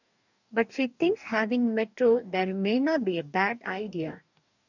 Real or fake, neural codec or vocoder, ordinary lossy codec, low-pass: fake; codec, 44.1 kHz, 2.6 kbps, DAC; none; 7.2 kHz